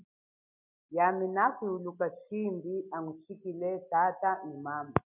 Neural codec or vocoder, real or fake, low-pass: none; real; 3.6 kHz